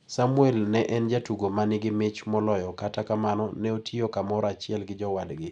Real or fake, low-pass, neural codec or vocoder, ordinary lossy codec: real; 10.8 kHz; none; none